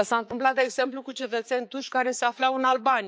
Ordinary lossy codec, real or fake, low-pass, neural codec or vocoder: none; fake; none; codec, 16 kHz, 4 kbps, X-Codec, HuBERT features, trained on balanced general audio